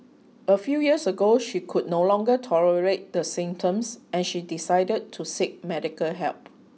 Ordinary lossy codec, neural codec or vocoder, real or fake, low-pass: none; none; real; none